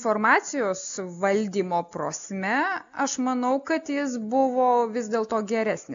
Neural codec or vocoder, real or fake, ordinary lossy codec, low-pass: none; real; MP3, 64 kbps; 7.2 kHz